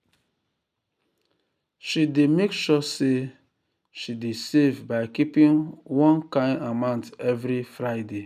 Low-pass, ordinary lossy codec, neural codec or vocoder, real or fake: 14.4 kHz; none; none; real